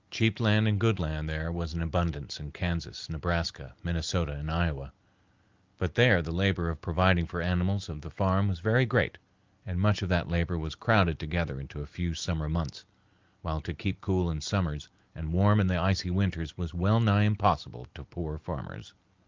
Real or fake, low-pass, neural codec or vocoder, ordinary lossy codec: real; 7.2 kHz; none; Opus, 24 kbps